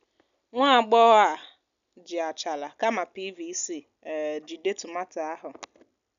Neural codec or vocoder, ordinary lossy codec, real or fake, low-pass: none; none; real; 7.2 kHz